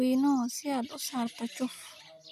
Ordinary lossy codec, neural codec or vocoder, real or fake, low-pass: none; none; real; 14.4 kHz